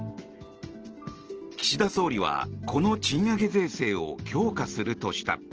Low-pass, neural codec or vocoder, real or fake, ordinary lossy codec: 7.2 kHz; none; real; Opus, 16 kbps